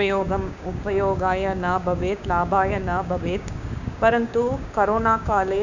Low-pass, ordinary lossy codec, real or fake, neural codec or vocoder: 7.2 kHz; none; fake; codec, 16 kHz, 6 kbps, DAC